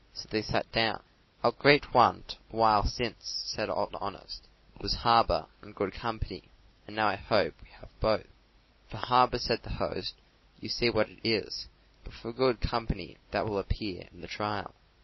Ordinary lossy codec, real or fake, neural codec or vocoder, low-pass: MP3, 24 kbps; real; none; 7.2 kHz